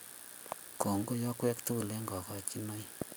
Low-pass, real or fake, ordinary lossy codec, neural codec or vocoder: none; real; none; none